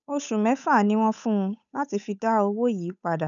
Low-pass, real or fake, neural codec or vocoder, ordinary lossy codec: 7.2 kHz; fake; codec, 16 kHz, 8 kbps, FunCodec, trained on Chinese and English, 25 frames a second; none